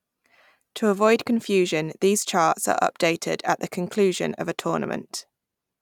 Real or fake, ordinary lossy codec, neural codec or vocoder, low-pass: real; none; none; 19.8 kHz